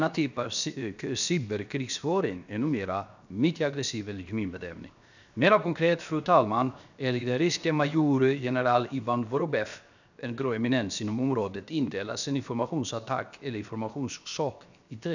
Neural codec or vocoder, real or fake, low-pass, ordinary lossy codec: codec, 16 kHz, 0.7 kbps, FocalCodec; fake; 7.2 kHz; none